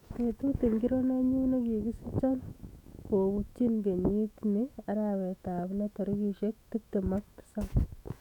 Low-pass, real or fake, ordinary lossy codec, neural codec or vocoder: 19.8 kHz; fake; none; autoencoder, 48 kHz, 128 numbers a frame, DAC-VAE, trained on Japanese speech